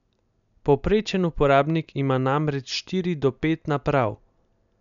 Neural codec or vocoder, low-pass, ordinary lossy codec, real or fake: none; 7.2 kHz; none; real